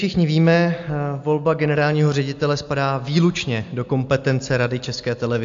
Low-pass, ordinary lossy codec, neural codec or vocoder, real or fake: 7.2 kHz; AAC, 64 kbps; none; real